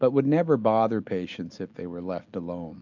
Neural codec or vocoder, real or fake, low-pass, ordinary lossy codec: none; real; 7.2 kHz; MP3, 64 kbps